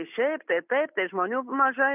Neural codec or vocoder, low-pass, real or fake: none; 3.6 kHz; real